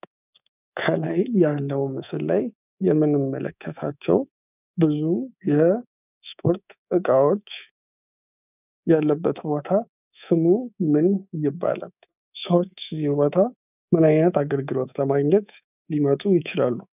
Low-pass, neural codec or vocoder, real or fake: 3.6 kHz; codec, 24 kHz, 3.1 kbps, DualCodec; fake